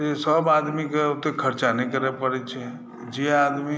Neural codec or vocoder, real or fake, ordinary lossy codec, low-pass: none; real; none; none